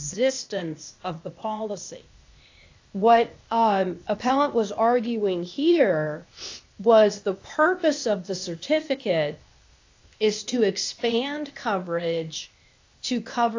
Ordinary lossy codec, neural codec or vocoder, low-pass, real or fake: AAC, 48 kbps; codec, 16 kHz, 0.8 kbps, ZipCodec; 7.2 kHz; fake